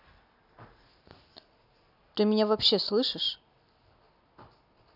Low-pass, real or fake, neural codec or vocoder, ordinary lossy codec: 5.4 kHz; real; none; none